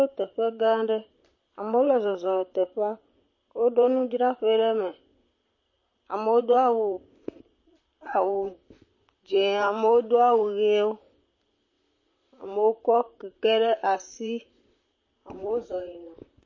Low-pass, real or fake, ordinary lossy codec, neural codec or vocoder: 7.2 kHz; fake; MP3, 32 kbps; vocoder, 44.1 kHz, 128 mel bands, Pupu-Vocoder